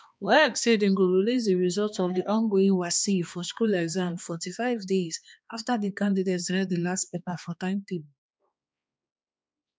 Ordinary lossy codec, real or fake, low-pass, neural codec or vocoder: none; fake; none; codec, 16 kHz, 2 kbps, X-Codec, HuBERT features, trained on balanced general audio